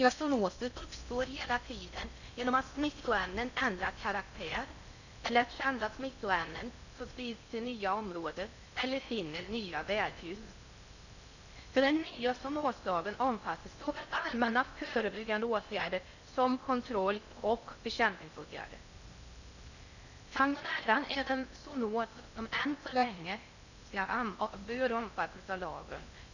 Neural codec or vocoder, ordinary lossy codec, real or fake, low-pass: codec, 16 kHz in and 24 kHz out, 0.6 kbps, FocalCodec, streaming, 4096 codes; none; fake; 7.2 kHz